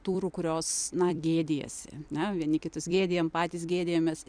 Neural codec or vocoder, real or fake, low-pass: vocoder, 44.1 kHz, 128 mel bands every 256 samples, BigVGAN v2; fake; 9.9 kHz